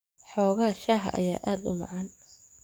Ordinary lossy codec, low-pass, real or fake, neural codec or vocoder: none; none; fake; codec, 44.1 kHz, 7.8 kbps, DAC